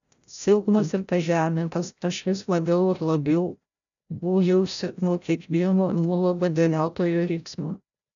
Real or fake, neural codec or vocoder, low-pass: fake; codec, 16 kHz, 0.5 kbps, FreqCodec, larger model; 7.2 kHz